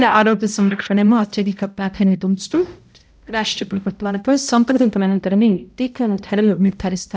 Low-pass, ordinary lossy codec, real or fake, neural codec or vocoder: none; none; fake; codec, 16 kHz, 0.5 kbps, X-Codec, HuBERT features, trained on balanced general audio